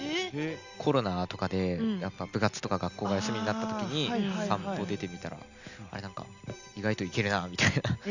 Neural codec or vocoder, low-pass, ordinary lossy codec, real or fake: none; 7.2 kHz; none; real